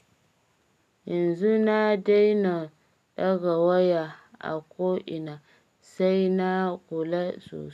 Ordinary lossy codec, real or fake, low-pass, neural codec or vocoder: none; real; 14.4 kHz; none